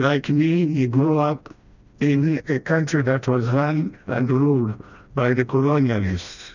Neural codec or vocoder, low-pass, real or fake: codec, 16 kHz, 1 kbps, FreqCodec, smaller model; 7.2 kHz; fake